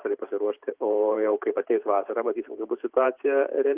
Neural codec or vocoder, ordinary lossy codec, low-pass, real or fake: vocoder, 44.1 kHz, 128 mel bands every 512 samples, BigVGAN v2; Opus, 24 kbps; 3.6 kHz; fake